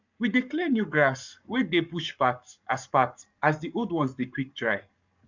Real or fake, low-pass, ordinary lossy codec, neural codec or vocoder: fake; 7.2 kHz; none; codec, 44.1 kHz, 7.8 kbps, Pupu-Codec